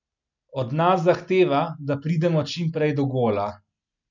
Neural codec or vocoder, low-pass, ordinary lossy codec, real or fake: none; 7.2 kHz; none; real